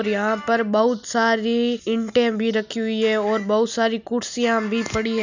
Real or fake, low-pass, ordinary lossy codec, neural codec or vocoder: real; 7.2 kHz; none; none